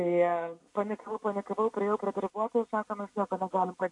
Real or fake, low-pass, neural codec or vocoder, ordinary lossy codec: real; 10.8 kHz; none; AAC, 48 kbps